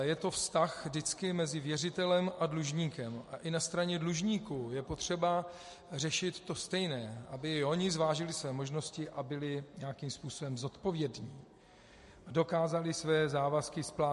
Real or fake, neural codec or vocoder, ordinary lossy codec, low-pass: real; none; MP3, 48 kbps; 14.4 kHz